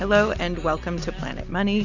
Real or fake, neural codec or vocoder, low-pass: real; none; 7.2 kHz